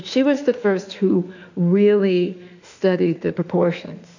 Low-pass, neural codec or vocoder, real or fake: 7.2 kHz; autoencoder, 48 kHz, 32 numbers a frame, DAC-VAE, trained on Japanese speech; fake